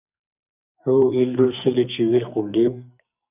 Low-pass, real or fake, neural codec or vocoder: 3.6 kHz; fake; codec, 44.1 kHz, 2.6 kbps, SNAC